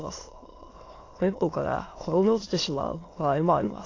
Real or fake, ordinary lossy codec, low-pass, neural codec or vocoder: fake; AAC, 32 kbps; 7.2 kHz; autoencoder, 22.05 kHz, a latent of 192 numbers a frame, VITS, trained on many speakers